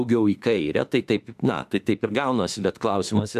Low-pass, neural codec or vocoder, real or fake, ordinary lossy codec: 14.4 kHz; autoencoder, 48 kHz, 32 numbers a frame, DAC-VAE, trained on Japanese speech; fake; AAC, 96 kbps